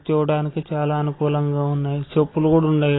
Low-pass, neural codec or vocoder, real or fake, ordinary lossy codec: 7.2 kHz; none; real; AAC, 16 kbps